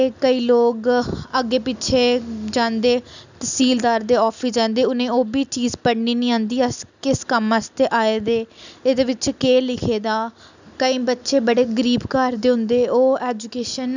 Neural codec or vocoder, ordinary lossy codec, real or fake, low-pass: none; none; real; 7.2 kHz